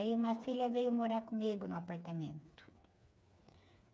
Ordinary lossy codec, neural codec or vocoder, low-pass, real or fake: none; codec, 16 kHz, 4 kbps, FreqCodec, smaller model; none; fake